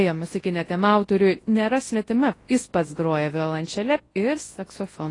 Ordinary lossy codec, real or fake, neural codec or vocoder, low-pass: AAC, 32 kbps; fake; codec, 24 kHz, 0.9 kbps, WavTokenizer, large speech release; 10.8 kHz